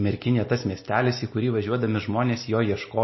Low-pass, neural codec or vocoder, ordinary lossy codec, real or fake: 7.2 kHz; none; MP3, 24 kbps; real